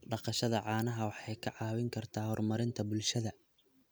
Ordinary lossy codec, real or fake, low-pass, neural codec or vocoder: none; real; none; none